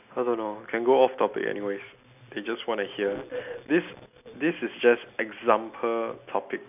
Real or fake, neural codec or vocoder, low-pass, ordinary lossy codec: real; none; 3.6 kHz; none